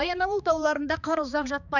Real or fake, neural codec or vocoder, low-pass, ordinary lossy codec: fake; codec, 16 kHz, 2 kbps, X-Codec, HuBERT features, trained on balanced general audio; 7.2 kHz; none